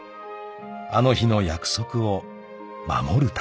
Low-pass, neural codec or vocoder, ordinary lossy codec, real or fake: none; none; none; real